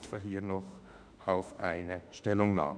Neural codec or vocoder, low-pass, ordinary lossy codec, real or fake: autoencoder, 48 kHz, 32 numbers a frame, DAC-VAE, trained on Japanese speech; 9.9 kHz; MP3, 96 kbps; fake